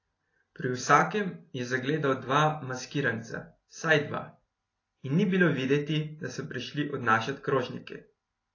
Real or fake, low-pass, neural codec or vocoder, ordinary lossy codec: real; 7.2 kHz; none; AAC, 32 kbps